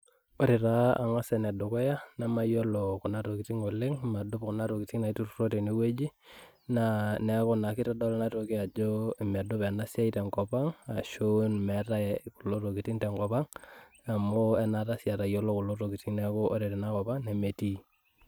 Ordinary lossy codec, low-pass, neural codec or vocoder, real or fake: none; none; none; real